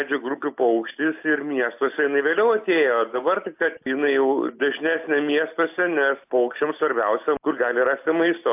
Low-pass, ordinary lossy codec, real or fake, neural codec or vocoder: 3.6 kHz; AAC, 32 kbps; real; none